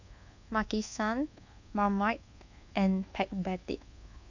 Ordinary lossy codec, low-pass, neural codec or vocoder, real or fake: none; 7.2 kHz; codec, 24 kHz, 1.2 kbps, DualCodec; fake